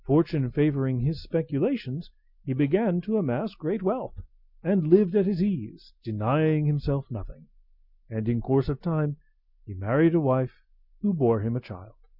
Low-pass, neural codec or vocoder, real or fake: 5.4 kHz; none; real